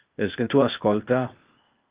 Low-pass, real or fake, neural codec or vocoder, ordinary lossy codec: 3.6 kHz; fake; codec, 16 kHz, 0.8 kbps, ZipCodec; Opus, 32 kbps